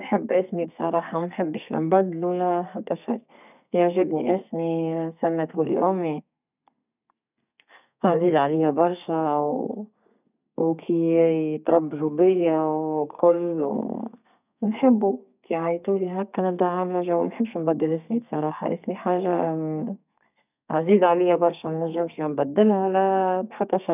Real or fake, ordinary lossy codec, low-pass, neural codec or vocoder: fake; none; 3.6 kHz; codec, 32 kHz, 1.9 kbps, SNAC